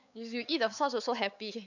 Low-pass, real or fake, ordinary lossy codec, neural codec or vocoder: 7.2 kHz; fake; none; codec, 16 kHz, 4 kbps, X-Codec, WavLM features, trained on Multilingual LibriSpeech